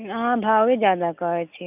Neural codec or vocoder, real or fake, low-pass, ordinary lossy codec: none; real; 3.6 kHz; none